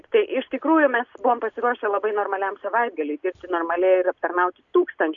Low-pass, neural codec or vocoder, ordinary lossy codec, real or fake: 7.2 kHz; none; MP3, 96 kbps; real